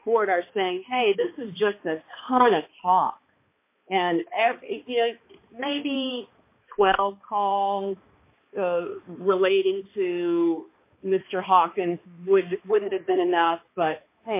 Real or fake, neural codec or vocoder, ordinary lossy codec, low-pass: fake; codec, 16 kHz, 2 kbps, X-Codec, HuBERT features, trained on balanced general audio; MP3, 24 kbps; 3.6 kHz